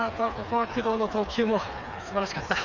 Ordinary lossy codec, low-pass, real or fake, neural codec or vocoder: none; 7.2 kHz; fake; codec, 16 kHz, 4 kbps, FreqCodec, smaller model